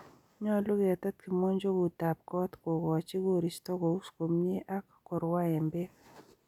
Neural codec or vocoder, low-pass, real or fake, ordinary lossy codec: none; 19.8 kHz; real; none